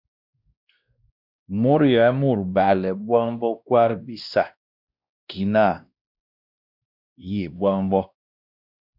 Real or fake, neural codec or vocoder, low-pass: fake; codec, 16 kHz, 1 kbps, X-Codec, WavLM features, trained on Multilingual LibriSpeech; 5.4 kHz